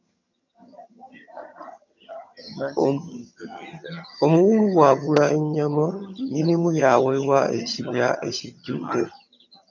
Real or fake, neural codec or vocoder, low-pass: fake; vocoder, 22.05 kHz, 80 mel bands, HiFi-GAN; 7.2 kHz